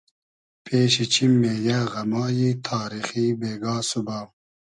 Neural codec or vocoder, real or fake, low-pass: none; real; 10.8 kHz